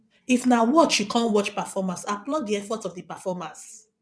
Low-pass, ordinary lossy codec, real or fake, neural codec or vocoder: none; none; fake; vocoder, 22.05 kHz, 80 mel bands, WaveNeXt